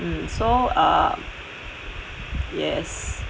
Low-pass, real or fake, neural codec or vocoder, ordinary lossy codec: none; real; none; none